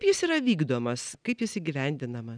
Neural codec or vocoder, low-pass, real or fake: none; 9.9 kHz; real